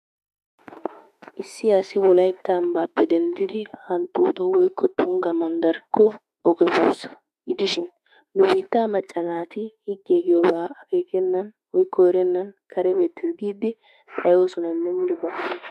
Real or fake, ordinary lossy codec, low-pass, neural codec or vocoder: fake; MP3, 96 kbps; 14.4 kHz; autoencoder, 48 kHz, 32 numbers a frame, DAC-VAE, trained on Japanese speech